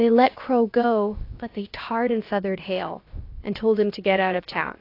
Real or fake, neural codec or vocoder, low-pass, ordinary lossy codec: fake; codec, 16 kHz, about 1 kbps, DyCAST, with the encoder's durations; 5.4 kHz; AAC, 32 kbps